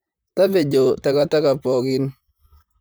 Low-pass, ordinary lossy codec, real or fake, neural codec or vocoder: none; none; fake; vocoder, 44.1 kHz, 128 mel bands, Pupu-Vocoder